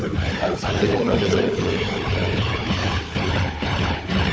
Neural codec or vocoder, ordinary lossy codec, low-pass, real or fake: codec, 16 kHz, 4 kbps, FunCodec, trained on Chinese and English, 50 frames a second; none; none; fake